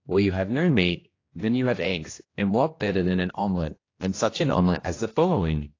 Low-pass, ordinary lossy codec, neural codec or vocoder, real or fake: 7.2 kHz; AAC, 32 kbps; codec, 16 kHz, 1 kbps, X-Codec, HuBERT features, trained on general audio; fake